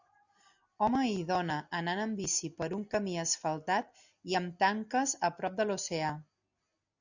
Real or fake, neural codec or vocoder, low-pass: real; none; 7.2 kHz